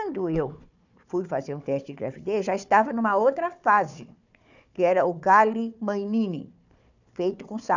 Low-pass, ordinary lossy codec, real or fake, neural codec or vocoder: 7.2 kHz; none; fake; codec, 16 kHz, 4 kbps, FunCodec, trained on Chinese and English, 50 frames a second